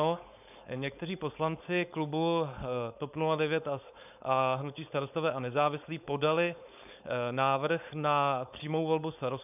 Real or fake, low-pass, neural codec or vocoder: fake; 3.6 kHz; codec, 16 kHz, 4.8 kbps, FACodec